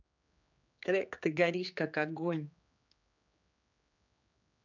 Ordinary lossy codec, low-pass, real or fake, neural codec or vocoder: none; 7.2 kHz; fake; codec, 16 kHz, 4 kbps, X-Codec, HuBERT features, trained on general audio